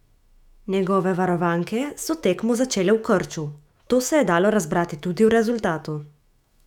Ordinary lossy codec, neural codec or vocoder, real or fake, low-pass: none; autoencoder, 48 kHz, 128 numbers a frame, DAC-VAE, trained on Japanese speech; fake; 19.8 kHz